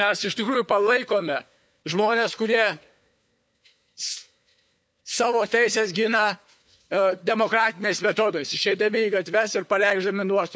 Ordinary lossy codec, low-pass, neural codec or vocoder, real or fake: none; none; codec, 16 kHz, 4 kbps, FunCodec, trained on LibriTTS, 50 frames a second; fake